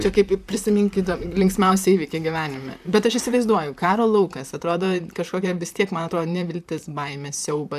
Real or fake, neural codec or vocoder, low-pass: fake; vocoder, 44.1 kHz, 128 mel bands, Pupu-Vocoder; 14.4 kHz